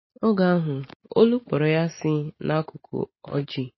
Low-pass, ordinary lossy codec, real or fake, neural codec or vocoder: 7.2 kHz; MP3, 24 kbps; real; none